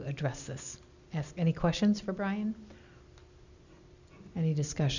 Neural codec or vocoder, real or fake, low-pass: none; real; 7.2 kHz